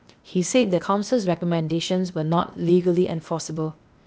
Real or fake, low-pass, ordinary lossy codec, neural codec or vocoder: fake; none; none; codec, 16 kHz, 0.8 kbps, ZipCodec